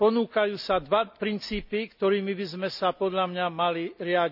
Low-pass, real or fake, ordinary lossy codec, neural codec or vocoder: 5.4 kHz; real; none; none